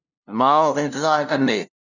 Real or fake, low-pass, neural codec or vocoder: fake; 7.2 kHz; codec, 16 kHz, 0.5 kbps, FunCodec, trained on LibriTTS, 25 frames a second